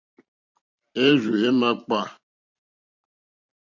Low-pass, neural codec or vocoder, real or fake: 7.2 kHz; vocoder, 44.1 kHz, 128 mel bands every 512 samples, BigVGAN v2; fake